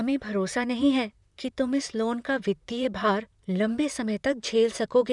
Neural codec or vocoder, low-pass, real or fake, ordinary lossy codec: vocoder, 44.1 kHz, 128 mel bands, Pupu-Vocoder; 10.8 kHz; fake; none